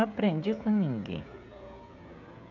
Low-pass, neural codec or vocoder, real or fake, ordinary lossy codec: 7.2 kHz; codec, 16 kHz, 16 kbps, FreqCodec, smaller model; fake; none